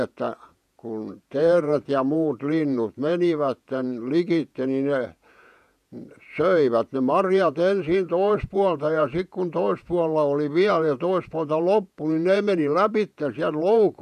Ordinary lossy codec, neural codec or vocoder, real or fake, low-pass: none; vocoder, 48 kHz, 128 mel bands, Vocos; fake; 14.4 kHz